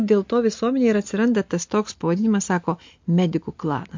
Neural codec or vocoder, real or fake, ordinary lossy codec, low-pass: none; real; MP3, 48 kbps; 7.2 kHz